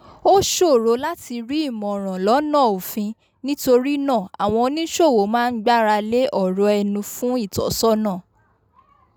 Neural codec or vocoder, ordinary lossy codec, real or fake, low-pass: none; none; real; none